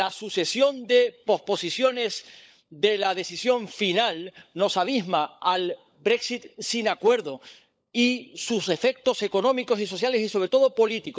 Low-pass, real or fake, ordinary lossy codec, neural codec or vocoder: none; fake; none; codec, 16 kHz, 16 kbps, FunCodec, trained on LibriTTS, 50 frames a second